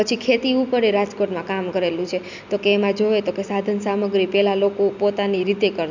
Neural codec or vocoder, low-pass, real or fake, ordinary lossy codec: none; 7.2 kHz; real; none